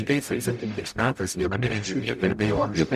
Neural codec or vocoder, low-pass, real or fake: codec, 44.1 kHz, 0.9 kbps, DAC; 14.4 kHz; fake